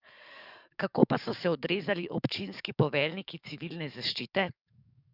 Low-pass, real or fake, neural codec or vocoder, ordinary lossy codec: 5.4 kHz; fake; codec, 44.1 kHz, 7.8 kbps, DAC; none